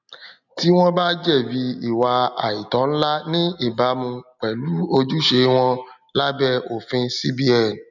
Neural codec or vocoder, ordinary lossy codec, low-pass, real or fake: none; none; 7.2 kHz; real